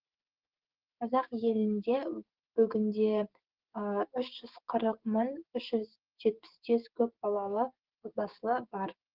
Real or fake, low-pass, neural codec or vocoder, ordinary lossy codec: fake; 5.4 kHz; codec, 16 kHz, 6 kbps, DAC; Opus, 16 kbps